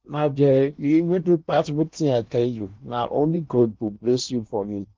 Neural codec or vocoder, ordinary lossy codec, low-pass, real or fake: codec, 16 kHz in and 24 kHz out, 0.8 kbps, FocalCodec, streaming, 65536 codes; Opus, 24 kbps; 7.2 kHz; fake